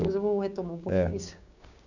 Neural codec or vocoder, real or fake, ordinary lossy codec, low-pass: codec, 16 kHz, 6 kbps, DAC; fake; none; 7.2 kHz